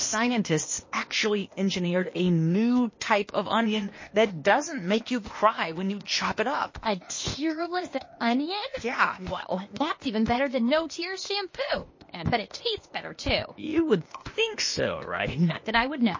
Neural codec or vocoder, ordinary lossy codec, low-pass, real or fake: codec, 16 kHz, 0.8 kbps, ZipCodec; MP3, 32 kbps; 7.2 kHz; fake